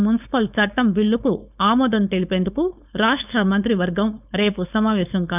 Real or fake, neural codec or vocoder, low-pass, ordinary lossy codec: fake; codec, 16 kHz, 4.8 kbps, FACodec; 3.6 kHz; none